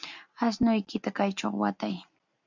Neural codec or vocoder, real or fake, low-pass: none; real; 7.2 kHz